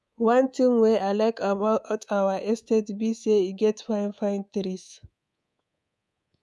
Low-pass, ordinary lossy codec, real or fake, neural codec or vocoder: none; none; fake; codec, 24 kHz, 3.1 kbps, DualCodec